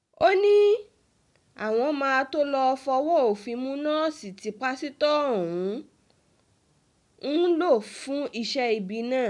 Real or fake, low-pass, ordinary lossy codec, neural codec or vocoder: real; 10.8 kHz; none; none